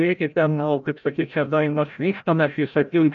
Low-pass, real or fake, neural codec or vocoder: 7.2 kHz; fake; codec, 16 kHz, 0.5 kbps, FreqCodec, larger model